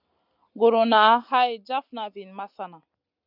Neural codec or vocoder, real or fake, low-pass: none; real; 5.4 kHz